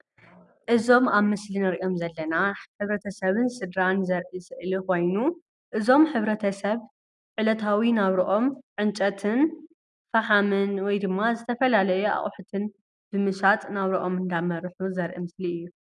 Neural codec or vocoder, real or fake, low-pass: none; real; 10.8 kHz